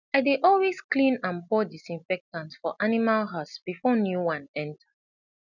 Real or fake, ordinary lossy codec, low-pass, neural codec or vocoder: real; none; 7.2 kHz; none